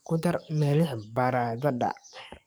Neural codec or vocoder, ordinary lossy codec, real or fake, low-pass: codec, 44.1 kHz, 7.8 kbps, DAC; none; fake; none